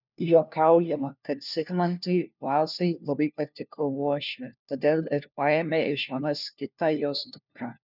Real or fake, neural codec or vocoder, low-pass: fake; codec, 16 kHz, 1 kbps, FunCodec, trained on LibriTTS, 50 frames a second; 5.4 kHz